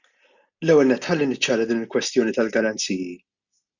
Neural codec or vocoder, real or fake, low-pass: none; real; 7.2 kHz